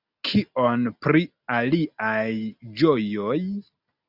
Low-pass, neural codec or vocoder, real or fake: 5.4 kHz; none; real